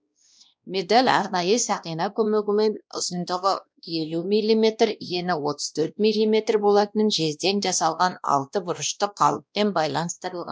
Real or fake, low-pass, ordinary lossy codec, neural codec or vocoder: fake; none; none; codec, 16 kHz, 1 kbps, X-Codec, WavLM features, trained on Multilingual LibriSpeech